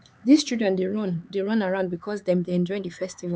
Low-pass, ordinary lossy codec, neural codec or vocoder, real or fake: none; none; codec, 16 kHz, 4 kbps, X-Codec, HuBERT features, trained on LibriSpeech; fake